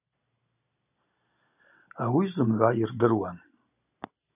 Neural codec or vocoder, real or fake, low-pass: none; real; 3.6 kHz